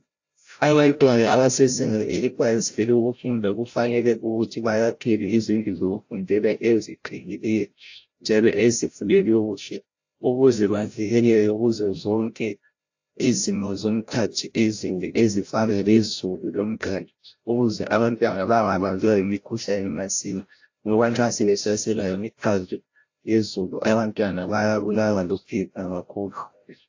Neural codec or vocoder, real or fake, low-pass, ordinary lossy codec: codec, 16 kHz, 0.5 kbps, FreqCodec, larger model; fake; 7.2 kHz; AAC, 48 kbps